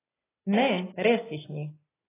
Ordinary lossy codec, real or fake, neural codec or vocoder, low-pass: AAC, 16 kbps; real; none; 3.6 kHz